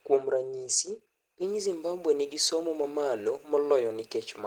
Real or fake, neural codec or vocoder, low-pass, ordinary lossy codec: real; none; 19.8 kHz; Opus, 16 kbps